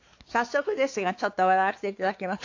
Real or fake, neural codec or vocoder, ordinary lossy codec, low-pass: fake; codec, 16 kHz, 4 kbps, X-Codec, WavLM features, trained on Multilingual LibriSpeech; AAC, 48 kbps; 7.2 kHz